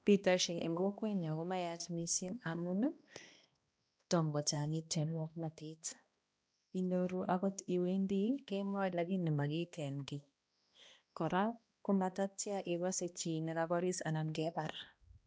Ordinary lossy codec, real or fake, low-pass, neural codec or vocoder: none; fake; none; codec, 16 kHz, 1 kbps, X-Codec, HuBERT features, trained on balanced general audio